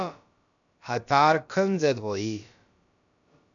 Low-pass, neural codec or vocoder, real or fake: 7.2 kHz; codec, 16 kHz, about 1 kbps, DyCAST, with the encoder's durations; fake